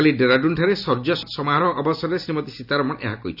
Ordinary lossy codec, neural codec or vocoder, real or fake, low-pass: none; none; real; 5.4 kHz